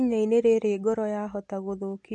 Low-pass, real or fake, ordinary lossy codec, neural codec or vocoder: 10.8 kHz; real; MP3, 48 kbps; none